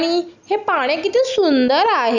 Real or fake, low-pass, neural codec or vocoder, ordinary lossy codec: real; 7.2 kHz; none; none